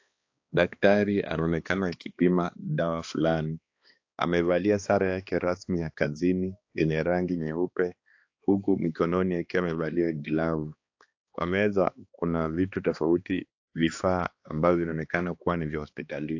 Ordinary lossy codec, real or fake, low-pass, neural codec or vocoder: AAC, 48 kbps; fake; 7.2 kHz; codec, 16 kHz, 2 kbps, X-Codec, HuBERT features, trained on balanced general audio